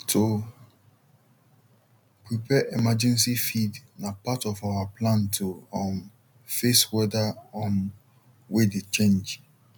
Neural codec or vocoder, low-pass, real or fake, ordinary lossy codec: none; 19.8 kHz; real; none